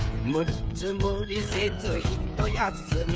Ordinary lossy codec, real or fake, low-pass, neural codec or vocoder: none; fake; none; codec, 16 kHz, 8 kbps, FreqCodec, smaller model